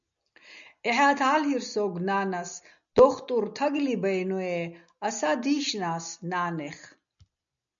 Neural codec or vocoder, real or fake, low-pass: none; real; 7.2 kHz